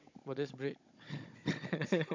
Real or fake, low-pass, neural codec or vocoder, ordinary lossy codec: fake; 7.2 kHz; codec, 16 kHz, 16 kbps, FunCodec, trained on Chinese and English, 50 frames a second; MP3, 48 kbps